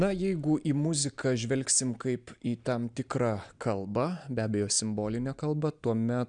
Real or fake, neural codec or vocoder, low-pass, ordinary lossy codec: real; none; 9.9 kHz; MP3, 96 kbps